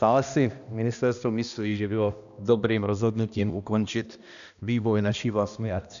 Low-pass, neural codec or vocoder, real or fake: 7.2 kHz; codec, 16 kHz, 1 kbps, X-Codec, HuBERT features, trained on balanced general audio; fake